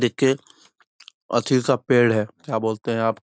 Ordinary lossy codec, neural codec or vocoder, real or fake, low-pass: none; none; real; none